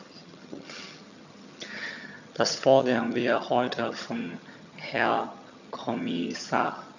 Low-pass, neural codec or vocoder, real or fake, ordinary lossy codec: 7.2 kHz; vocoder, 22.05 kHz, 80 mel bands, HiFi-GAN; fake; none